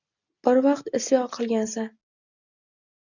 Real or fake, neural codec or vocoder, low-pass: real; none; 7.2 kHz